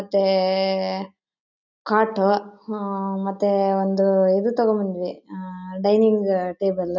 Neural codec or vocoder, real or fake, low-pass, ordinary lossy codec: none; real; 7.2 kHz; none